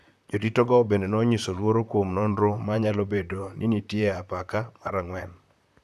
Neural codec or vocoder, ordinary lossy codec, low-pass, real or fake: vocoder, 44.1 kHz, 128 mel bands, Pupu-Vocoder; none; 14.4 kHz; fake